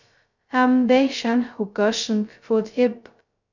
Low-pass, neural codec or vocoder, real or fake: 7.2 kHz; codec, 16 kHz, 0.2 kbps, FocalCodec; fake